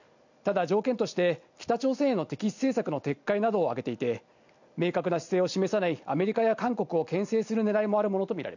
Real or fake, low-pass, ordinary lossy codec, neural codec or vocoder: real; 7.2 kHz; none; none